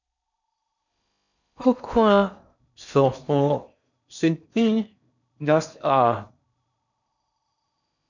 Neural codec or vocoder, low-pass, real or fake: codec, 16 kHz in and 24 kHz out, 0.6 kbps, FocalCodec, streaming, 4096 codes; 7.2 kHz; fake